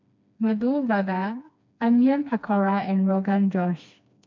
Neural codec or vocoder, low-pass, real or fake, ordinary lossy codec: codec, 16 kHz, 2 kbps, FreqCodec, smaller model; 7.2 kHz; fake; MP3, 64 kbps